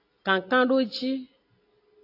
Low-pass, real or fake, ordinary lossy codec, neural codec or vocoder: 5.4 kHz; real; AAC, 32 kbps; none